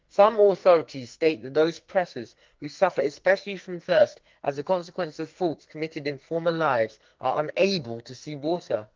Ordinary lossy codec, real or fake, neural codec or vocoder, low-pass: Opus, 32 kbps; fake; codec, 44.1 kHz, 2.6 kbps, SNAC; 7.2 kHz